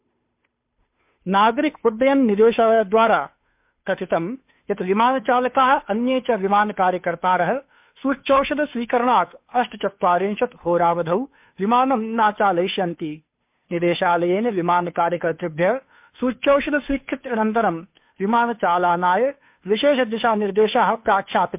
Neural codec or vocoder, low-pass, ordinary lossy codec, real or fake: codec, 16 kHz, 2 kbps, FunCodec, trained on Chinese and English, 25 frames a second; 3.6 kHz; MP3, 32 kbps; fake